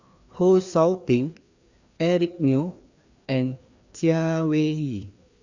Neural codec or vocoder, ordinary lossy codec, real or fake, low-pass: codec, 16 kHz, 2 kbps, FreqCodec, larger model; Opus, 64 kbps; fake; 7.2 kHz